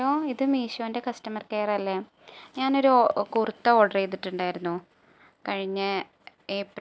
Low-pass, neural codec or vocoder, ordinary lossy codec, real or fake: none; none; none; real